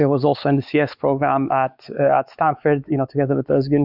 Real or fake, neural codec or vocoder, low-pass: fake; codec, 16 kHz, 4 kbps, X-Codec, WavLM features, trained on Multilingual LibriSpeech; 5.4 kHz